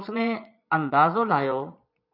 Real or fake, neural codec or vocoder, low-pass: fake; vocoder, 22.05 kHz, 80 mel bands, Vocos; 5.4 kHz